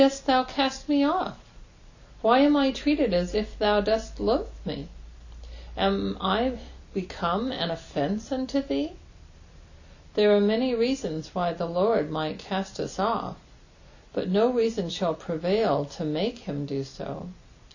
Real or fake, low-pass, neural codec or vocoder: real; 7.2 kHz; none